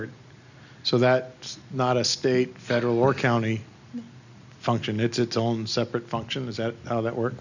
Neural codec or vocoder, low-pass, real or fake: none; 7.2 kHz; real